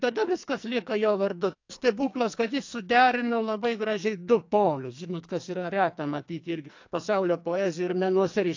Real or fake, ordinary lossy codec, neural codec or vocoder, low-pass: fake; AAC, 48 kbps; codec, 44.1 kHz, 2.6 kbps, SNAC; 7.2 kHz